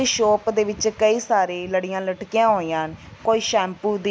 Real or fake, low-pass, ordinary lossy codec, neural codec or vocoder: real; none; none; none